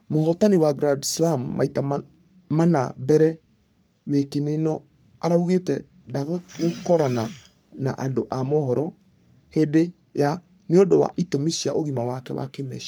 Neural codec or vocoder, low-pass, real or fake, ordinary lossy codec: codec, 44.1 kHz, 3.4 kbps, Pupu-Codec; none; fake; none